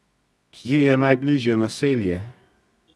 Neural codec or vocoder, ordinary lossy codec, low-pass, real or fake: codec, 24 kHz, 0.9 kbps, WavTokenizer, medium music audio release; none; none; fake